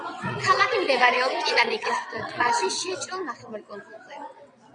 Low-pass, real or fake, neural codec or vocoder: 9.9 kHz; fake; vocoder, 22.05 kHz, 80 mel bands, WaveNeXt